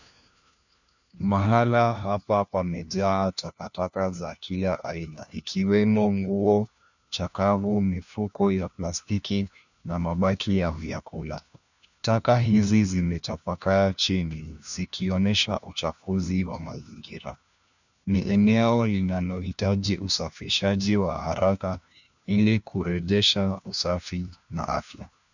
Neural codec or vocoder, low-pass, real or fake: codec, 16 kHz, 1 kbps, FunCodec, trained on LibriTTS, 50 frames a second; 7.2 kHz; fake